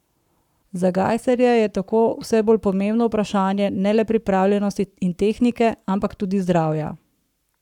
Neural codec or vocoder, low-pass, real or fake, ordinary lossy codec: codec, 44.1 kHz, 7.8 kbps, Pupu-Codec; 19.8 kHz; fake; none